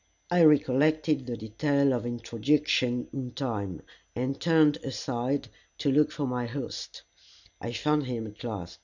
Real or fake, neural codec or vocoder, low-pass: real; none; 7.2 kHz